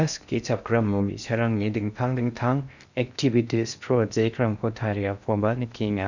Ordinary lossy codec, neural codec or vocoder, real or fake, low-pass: none; codec, 16 kHz in and 24 kHz out, 0.6 kbps, FocalCodec, streaming, 4096 codes; fake; 7.2 kHz